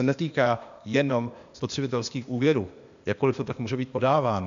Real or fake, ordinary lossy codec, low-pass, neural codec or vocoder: fake; MP3, 64 kbps; 7.2 kHz; codec, 16 kHz, 0.8 kbps, ZipCodec